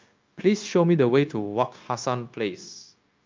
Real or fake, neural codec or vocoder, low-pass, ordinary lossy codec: fake; codec, 16 kHz, 0.9 kbps, LongCat-Audio-Codec; 7.2 kHz; Opus, 24 kbps